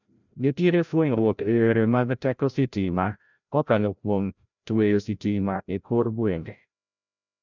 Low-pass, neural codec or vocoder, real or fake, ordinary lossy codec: 7.2 kHz; codec, 16 kHz, 0.5 kbps, FreqCodec, larger model; fake; none